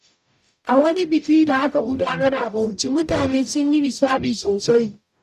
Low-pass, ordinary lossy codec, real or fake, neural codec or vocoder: 14.4 kHz; none; fake; codec, 44.1 kHz, 0.9 kbps, DAC